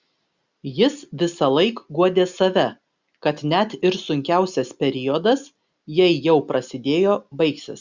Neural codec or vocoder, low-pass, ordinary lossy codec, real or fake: none; 7.2 kHz; Opus, 64 kbps; real